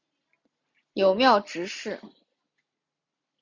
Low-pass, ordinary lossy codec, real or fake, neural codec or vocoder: 7.2 kHz; MP3, 64 kbps; real; none